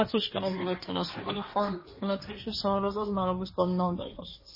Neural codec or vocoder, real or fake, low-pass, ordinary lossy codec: codec, 16 kHz, 1.1 kbps, Voila-Tokenizer; fake; 5.4 kHz; MP3, 24 kbps